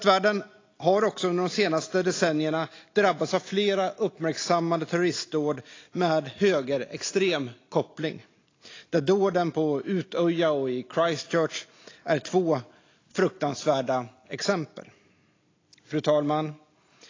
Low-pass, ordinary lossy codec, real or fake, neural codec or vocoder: 7.2 kHz; AAC, 32 kbps; real; none